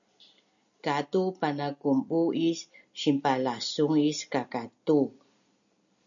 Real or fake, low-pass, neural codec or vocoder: real; 7.2 kHz; none